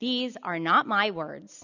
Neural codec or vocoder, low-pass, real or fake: none; 7.2 kHz; real